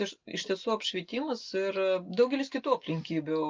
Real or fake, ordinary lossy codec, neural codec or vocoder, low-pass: real; Opus, 32 kbps; none; 7.2 kHz